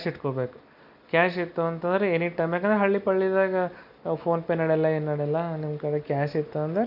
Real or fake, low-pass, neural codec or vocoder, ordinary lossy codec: real; 5.4 kHz; none; none